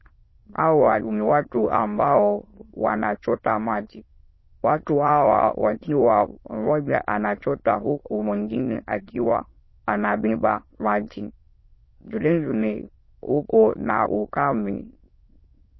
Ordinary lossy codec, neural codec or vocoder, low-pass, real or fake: MP3, 24 kbps; autoencoder, 22.05 kHz, a latent of 192 numbers a frame, VITS, trained on many speakers; 7.2 kHz; fake